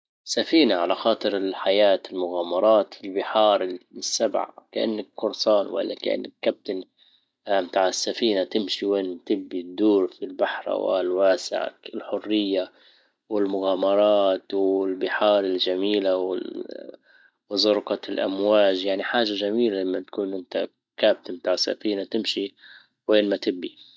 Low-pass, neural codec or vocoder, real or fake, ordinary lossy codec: none; none; real; none